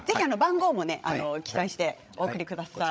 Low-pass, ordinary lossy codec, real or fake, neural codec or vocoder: none; none; fake; codec, 16 kHz, 16 kbps, FreqCodec, larger model